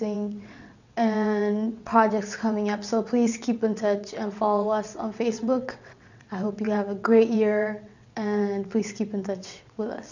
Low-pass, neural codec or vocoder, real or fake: 7.2 kHz; vocoder, 22.05 kHz, 80 mel bands, WaveNeXt; fake